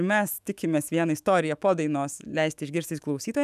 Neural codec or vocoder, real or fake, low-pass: autoencoder, 48 kHz, 128 numbers a frame, DAC-VAE, trained on Japanese speech; fake; 14.4 kHz